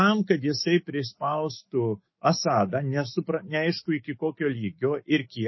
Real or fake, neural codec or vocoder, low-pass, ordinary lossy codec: fake; autoencoder, 48 kHz, 128 numbers a frame, DAC-VAE, trained on Japanese speech; 7.2 kHz; MP3, 24 kbps